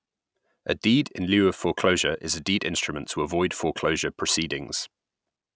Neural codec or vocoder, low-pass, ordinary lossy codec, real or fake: none; none; none; real